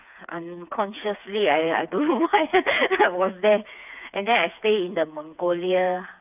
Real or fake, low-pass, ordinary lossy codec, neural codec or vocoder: fake; 3.6 kHz; none; codec, 16 kHz, 4 kbps, FreqCodec, smaller model